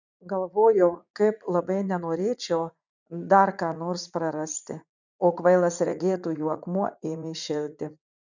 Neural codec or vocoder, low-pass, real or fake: vocoder, 44.1 kHz, 80 mel bands, Vocos; 7.2 kHz; fake